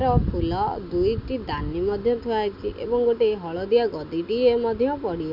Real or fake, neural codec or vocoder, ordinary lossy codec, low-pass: real; none; none; 5.4 kHz